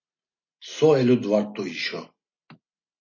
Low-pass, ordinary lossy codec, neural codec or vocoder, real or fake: 7.2 kHz; MP3, 32 kbps; none; real